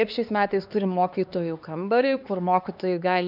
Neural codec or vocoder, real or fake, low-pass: codec, 16 kHz, 2 kbps, X-Codec, HuBERT features, trained on LibriSpeech; fake; 5.4 kHz